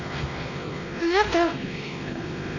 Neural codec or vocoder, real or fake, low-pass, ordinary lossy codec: codec, 16 kHz, 1 kbps, X-Codec, WavLM features, trained on Multilingual LibriSpeech; fake; 7.2 kHz; none